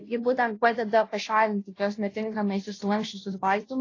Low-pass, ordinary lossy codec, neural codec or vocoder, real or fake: 7.2 kHz; AAC, 32 kbps; codec, 16 kHz, 0.5 kbps, FunCodec, trained on Chinese and English, 25 frames a second; fake